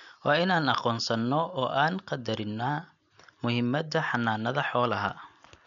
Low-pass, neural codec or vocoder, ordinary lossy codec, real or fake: 7.2 kHz; none; MP3, 96 kbps; real